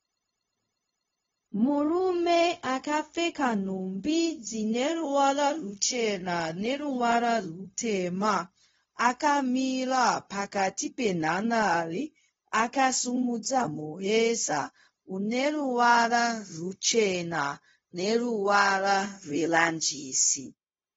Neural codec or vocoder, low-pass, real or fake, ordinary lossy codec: codec, 16 kHz, 0.4 kbps, LongCat-Audio-Codec; 7.2 kHz; fake; AAC, 24 kbps